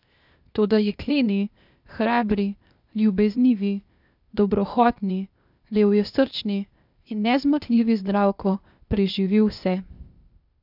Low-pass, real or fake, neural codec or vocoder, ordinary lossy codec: 5.4 kHz; fake; codec, 16 kHz, 0.8 kbps, ZipCodec; none